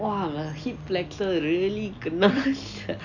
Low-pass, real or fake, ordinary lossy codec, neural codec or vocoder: 7.2 kHz; real; none; none